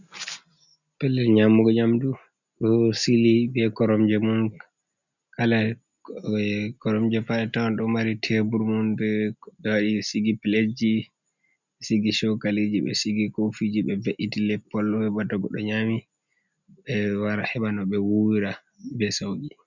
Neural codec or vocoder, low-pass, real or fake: none; 7.2 kHz; real